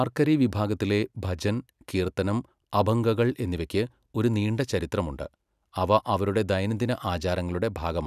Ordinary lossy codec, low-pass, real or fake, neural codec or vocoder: none; 14.4 kHz; real; none